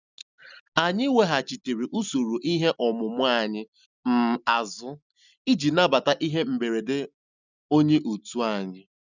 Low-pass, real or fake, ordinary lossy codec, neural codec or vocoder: 7.2 kHz; real; none; none